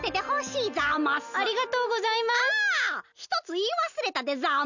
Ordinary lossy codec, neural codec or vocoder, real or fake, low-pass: none; none; real; 7.2 kHz